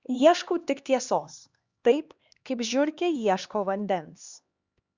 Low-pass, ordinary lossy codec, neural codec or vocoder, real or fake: 7.2 kHz; Opus, 64 kbps; codec, 16 kHz, 2 kbps, X-Codec, HuBERT features, trained on LibriSpeech; fake